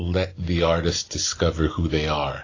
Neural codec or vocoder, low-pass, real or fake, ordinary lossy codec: none; 7.2 kHz; real; AAC, 32 kbps